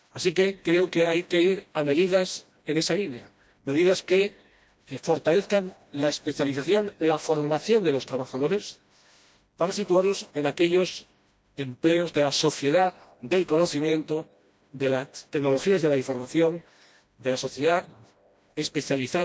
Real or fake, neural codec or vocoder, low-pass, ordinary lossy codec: fake; codec, 16 kHz, 1 kbps, FreqCodec, smaller model; none; none